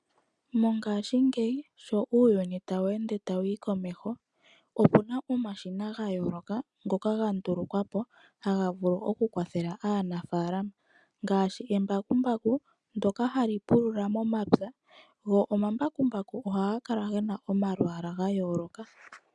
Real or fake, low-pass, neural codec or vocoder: real; 10.8 kHz; none